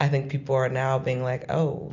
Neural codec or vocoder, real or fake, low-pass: none; real; 7.2 kHz